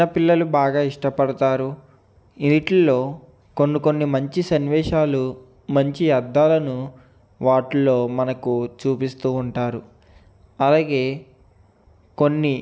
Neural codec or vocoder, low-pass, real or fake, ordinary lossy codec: none; none; real; none